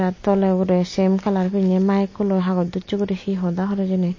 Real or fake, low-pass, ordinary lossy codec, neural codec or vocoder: real; 7.2 kHz; MP3, 32 kbps; none